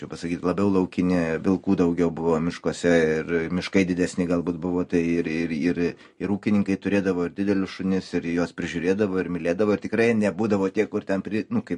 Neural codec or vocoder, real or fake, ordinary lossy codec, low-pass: none; real; MP3, 48 kbps; 10.8 kHz